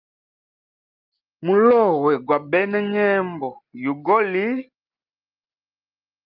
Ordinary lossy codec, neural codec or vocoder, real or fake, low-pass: Opus, 24 kbps; none; real; 5.4 kHz